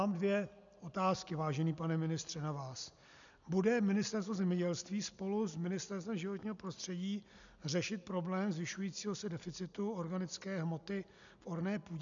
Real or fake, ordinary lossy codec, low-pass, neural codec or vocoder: real; MP3, 96 kbps; 7.2 kHz; none